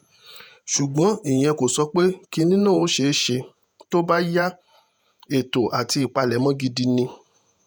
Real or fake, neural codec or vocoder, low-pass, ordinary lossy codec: fake; vocoder, 48 kHz, 128 mel bands, Vocos; none; none